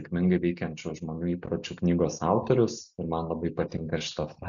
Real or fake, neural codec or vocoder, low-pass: real; none; 7.2 kHz